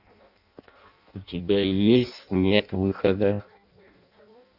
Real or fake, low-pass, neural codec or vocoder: fake; 5.4 kHz; codec, 16 kHz in and 24 kHz out, 0.6 kbps, FireRedTTS-2 codec